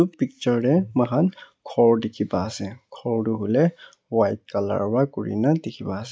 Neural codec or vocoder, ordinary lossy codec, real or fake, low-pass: none; none; real; none